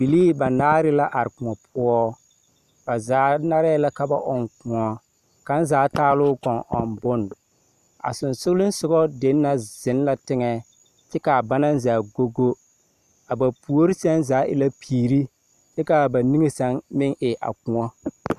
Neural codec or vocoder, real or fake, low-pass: none; real; 14.4 kHz